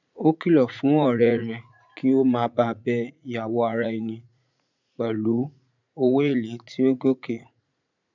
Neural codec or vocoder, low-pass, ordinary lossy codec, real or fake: vocoder, 44.1 kHz, 80 mel bands, Vocos; 7.2 kHz; none; fake